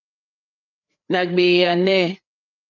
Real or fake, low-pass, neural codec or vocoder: fake; 7.2 kHz; codec, 16 kHz, 4 kbps, FreqCodec, larger model